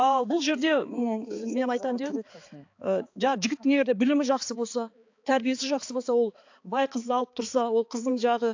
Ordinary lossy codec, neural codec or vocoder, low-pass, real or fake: AAC, 48 kbps; codec, 16 kHz, 4 kbps, X-Codec, HuBERT features, trained on balanced general audio; 7.2 kHz; fake